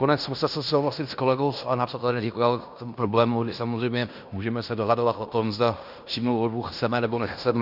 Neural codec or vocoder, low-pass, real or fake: codec, 16 kHz in and 24 kHz out, 0.9 kbps, LongCat-Audio-Codec, fine tuned four codebook decoder; 5.4 kHz; fake